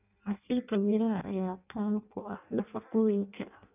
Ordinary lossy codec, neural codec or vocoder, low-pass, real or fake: none; codec, 16 kHz in and 24 kHz out, 0.6 kbps, FireRedTTS-2 codec; 3.6 kHz; fake